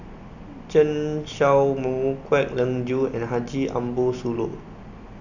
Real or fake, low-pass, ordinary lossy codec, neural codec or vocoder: real; 7.2 kHz; none; none